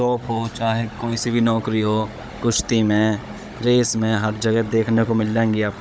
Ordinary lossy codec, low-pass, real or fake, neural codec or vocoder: none; none; fake; codec, 16 kHz, 16 kbps, FunCodec, trained on Chinese and English, 50 frames a second